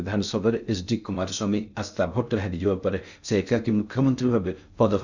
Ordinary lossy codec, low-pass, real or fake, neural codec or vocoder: none; 7.2 kHz; fake; codec, 16 kHz in and 24 kHz out, 0.6 kbps, FocalCodec, streaming, 2048 codes